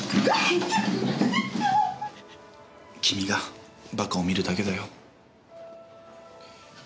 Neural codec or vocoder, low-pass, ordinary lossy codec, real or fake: none; none; none; real